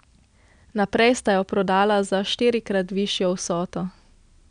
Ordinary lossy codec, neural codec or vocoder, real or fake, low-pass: none; none; real; 9.9 kHz